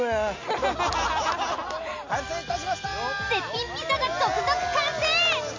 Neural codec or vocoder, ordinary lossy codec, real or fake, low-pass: none; MP3, 48 kbps; real; 7.2 kHz